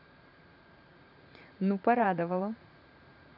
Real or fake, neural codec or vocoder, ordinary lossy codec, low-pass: fake; vocoder, 44.1 kHz, 80 mel bands, Vocos; none; 5.4 kHz